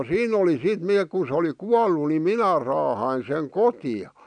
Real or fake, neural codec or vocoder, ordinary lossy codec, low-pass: real; none; MP3, 96 kbps; 9.9 kHz